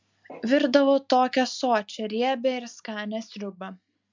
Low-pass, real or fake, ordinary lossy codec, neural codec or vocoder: 7.2 kHz; real; MP3, 64 kbps; none